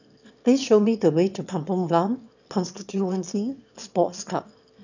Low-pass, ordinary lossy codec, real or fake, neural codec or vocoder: 7.2 kHz; none; fake; autoencoder, 22.05 kHz, a latent of 192 numbers a frame, VITS, trained on one speaker